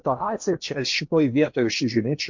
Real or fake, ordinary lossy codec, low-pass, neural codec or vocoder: fake; MP3, 48 kbps; 7.2 kHz; codec, 16 kHz in and 24 kHz out, 0.8 kbps, FocalCodec, streaming, 65536 codes